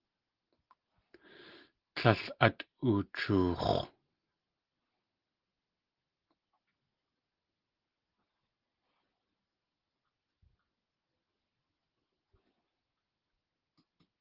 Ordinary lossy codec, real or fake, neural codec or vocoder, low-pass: Opus, 16 kbps; real; none; 5.4 kHz